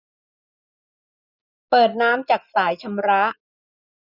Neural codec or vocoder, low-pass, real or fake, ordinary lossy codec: none; 5.4 kHz; real; none